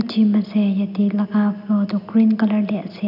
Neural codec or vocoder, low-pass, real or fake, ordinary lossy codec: none; 5.4 kHz; real; none